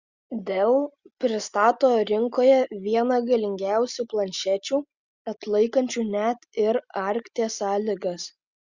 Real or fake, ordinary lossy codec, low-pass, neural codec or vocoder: real; Opus, 64 kbps; 7.2 kHz; none